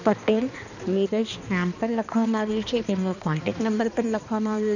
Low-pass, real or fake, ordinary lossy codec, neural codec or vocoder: 7.2 kHz; fake; none; codec, 16 kHz, 2 kbps, X-Codec, HuBERT features, trained on balanced general audio